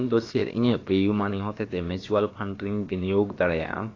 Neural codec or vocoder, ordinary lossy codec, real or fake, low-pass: codec, 16 kHz, about 1 kbps, DyCAST, with the encoder's durations; AAC, 32 kbps; fake; 7.2 kHz